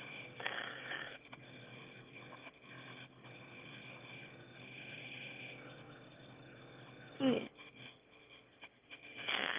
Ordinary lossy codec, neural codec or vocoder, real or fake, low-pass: Opus, 24 kbps; autoencoder, 22.05 kHz, a latent of 192 numbers a frame, VITS, trained on one speaker; fake; 3.6 kHz